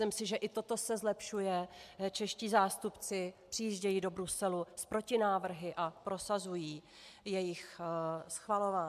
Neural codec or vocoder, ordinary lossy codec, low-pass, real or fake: none; MP3, 96 kbps; 14.4 kHz; real